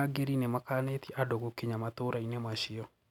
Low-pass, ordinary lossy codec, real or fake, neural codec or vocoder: 19.8 kHz; none; real; none